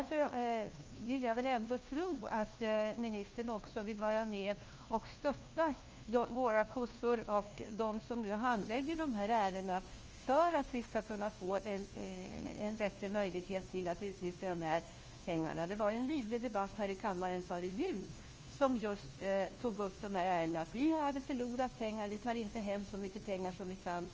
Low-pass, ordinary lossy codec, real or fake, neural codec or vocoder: 7.2 kHz; Opus, 24 kbps; fake; codec, 16 kHz, 1 kbps, FunCodec, trained on LibriTTS, 50 frames a second